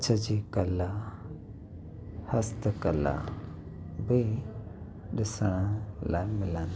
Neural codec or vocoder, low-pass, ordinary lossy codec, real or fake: none; none; none; real